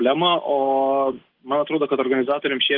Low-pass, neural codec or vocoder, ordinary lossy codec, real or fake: 5.4 kHz; none; Opus, 16 kbps; real